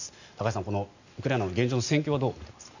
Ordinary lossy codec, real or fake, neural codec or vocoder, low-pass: none; real; none; 7.2 kHz